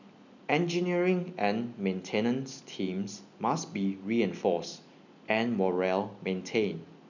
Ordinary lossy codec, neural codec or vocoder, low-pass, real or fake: none; none; 7.2 kHz; real